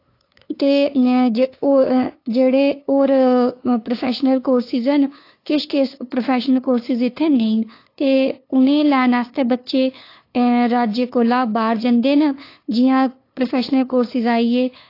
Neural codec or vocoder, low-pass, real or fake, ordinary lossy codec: codec, 16 kHz, 2 kbps, FunCodec, trained on LibriTTS, 25 frames a second; 5.4 kHz; fake; AAC, 32 kbps